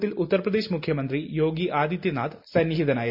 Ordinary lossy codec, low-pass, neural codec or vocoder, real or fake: MP3, 48 kbps; 5.4 kHz; none; real